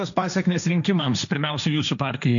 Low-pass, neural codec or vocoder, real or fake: 7.2 kHz; codec, 16 kHz, 1.1 kbps, Voila-Tokenizer; fake